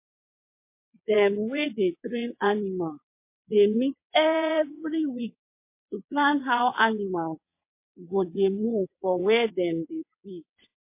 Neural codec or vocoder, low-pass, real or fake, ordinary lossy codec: vocoder, 22.05 kHz, 80 mel bands, WaveNeXt; 3.6 kHz; fake; MP3, 24 kbps